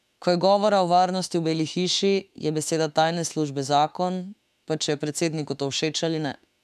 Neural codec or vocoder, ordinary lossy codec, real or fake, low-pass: autoencoder, 48 kHz, 32 numbers a frame, DAC-VAE, trained on Japanese speech; none; fake; 14.4 kHz